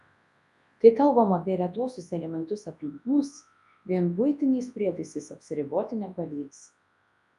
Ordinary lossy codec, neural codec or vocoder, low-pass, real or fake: AAC, 96 kbps; codec, 24 kHz, 0.9 kbps, WavTokenizer, large speech release; 10.8 kHz; fake